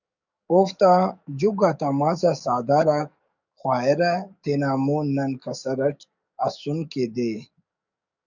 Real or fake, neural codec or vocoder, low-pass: fake; codec, 44.1 kHz, 7.8 kbps, DAC; 7.2 kHz